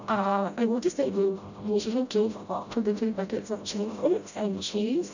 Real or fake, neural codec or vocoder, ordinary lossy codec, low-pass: fake; codec, 16 kHz, 0.5 kbps, FreqCodec, smaller model; none; 7.2 kHz